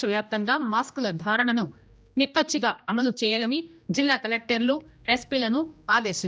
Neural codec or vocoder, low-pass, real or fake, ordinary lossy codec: codec, 16 kHz, 1 kbps, X-Codec, HuBERT features, trained on general audio; none; fake; none